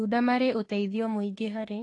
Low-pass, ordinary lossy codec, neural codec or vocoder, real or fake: 10.8 kHz; AAC, 32 kbps; autoencoder, 48 kHz, 32 numbers a frame, DAC-VAE, trained on Japanese speech; fake